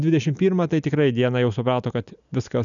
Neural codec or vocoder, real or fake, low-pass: none; real; 7.2 kHz